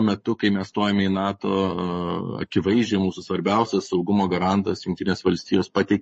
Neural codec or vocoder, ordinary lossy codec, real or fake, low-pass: codec, 44.1 kHz, 7.8 kbps, Pupu-Codec; MP3, 32 kbps; fake; 10.8 kHz